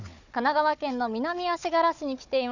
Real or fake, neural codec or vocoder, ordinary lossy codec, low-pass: fake; codec, 16 kHz, 4 kbps, FunCodec, trained on Chinese and English, 50 frames a second; none; 7.2 kHz